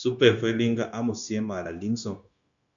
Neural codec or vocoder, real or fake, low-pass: codec, 16 kHz, 0.9 kbps, LongCat-Audio-Codec; fake; 7.2 kHz